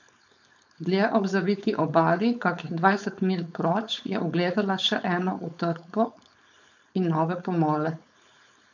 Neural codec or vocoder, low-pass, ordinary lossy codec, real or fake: codec, 16 kHz, 4.8 kbps, FACodec; 7.2 kHz; none; fake